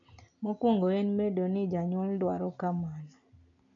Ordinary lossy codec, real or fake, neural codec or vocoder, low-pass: none; real; none; 7.2 kHz